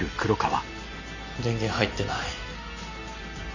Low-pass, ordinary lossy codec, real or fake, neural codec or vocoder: 7.2 kHz; none; real; none